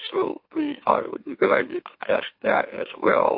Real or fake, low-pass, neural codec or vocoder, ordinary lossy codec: fake; 5.4 kHz; autoencoder, 44.1 kHz, a latent of 192 numbers a frame, MeloTTS; MP3, 32 kbps